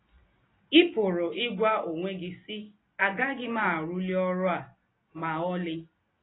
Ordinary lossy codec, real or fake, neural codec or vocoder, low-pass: AAC, 16 kbps; real; none; 7.2 kHz